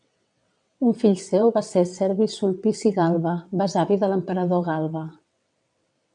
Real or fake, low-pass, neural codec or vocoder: fake; 9.9 kHz; vocoder, 22.05 kHz, 80 mel bands, WaveNeXt